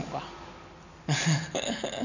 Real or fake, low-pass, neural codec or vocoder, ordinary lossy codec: real; 7.2 kHz; none; none